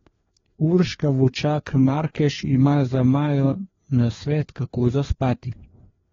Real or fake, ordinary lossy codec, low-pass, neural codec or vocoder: fake; AAC, 24 kbps; 7.2 kHz; codec, 16 kHz, 2 kbps, FreqCodec, larger model